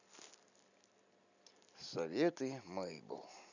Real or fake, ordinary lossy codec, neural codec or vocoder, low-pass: real; none; none; 7.2 kHz